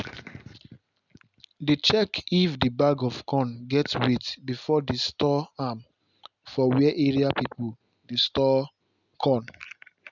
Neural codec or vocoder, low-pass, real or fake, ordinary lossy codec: none; 7.2 kHz; real; none